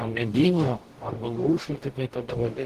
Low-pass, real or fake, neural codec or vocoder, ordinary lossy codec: 14.4 kHz; fake; codec, 44.1 kHz, 0.9 kbps, DAC; Opus, 16 kbps